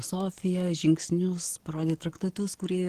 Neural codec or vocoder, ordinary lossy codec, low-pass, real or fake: codec, 44.1 kHz, 7.8 kbps, DAC; Opus, 16 kbps; 14.4 kHz; fake